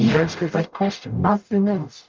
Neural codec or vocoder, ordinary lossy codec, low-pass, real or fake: codec, 44.1 kHz, 0.9 kbps, DAC; Opus, 32 kbps; 7.2 kHz; fake